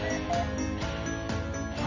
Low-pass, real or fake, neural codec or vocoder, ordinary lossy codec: 7.2 kHz; real; none; none